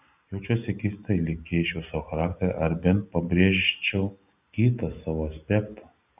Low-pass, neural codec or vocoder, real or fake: 3.6 kHz; none; real